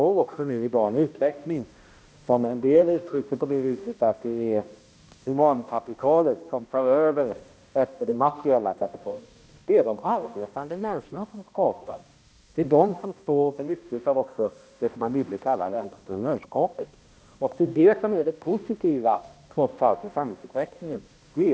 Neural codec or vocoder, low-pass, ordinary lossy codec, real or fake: codec, 16 kHz, 1 kbps, X-Codec, HuBERT features, trained on balanced general audio; none; none; fake